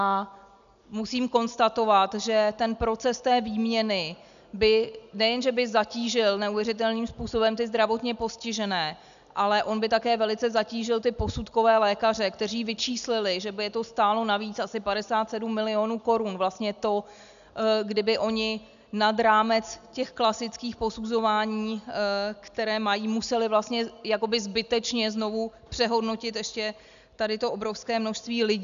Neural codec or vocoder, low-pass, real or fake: none; 7.2 kHz; real